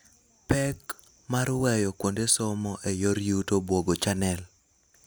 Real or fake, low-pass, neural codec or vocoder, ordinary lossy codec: real; none; none; none